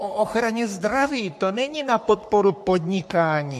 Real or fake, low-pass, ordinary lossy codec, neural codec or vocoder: fake; 14.4 kHz; MP3, 64 kbps; codec, 44.1 kHz, 3.4 kbps, Pupu-Codec